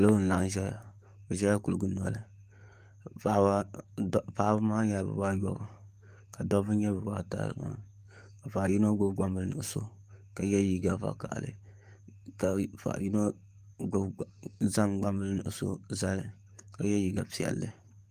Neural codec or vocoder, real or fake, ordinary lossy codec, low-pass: codec, 44.1 kHz, 7.8 kbps, DAC; fake; Opus, 32 kbps; 14.4 kHz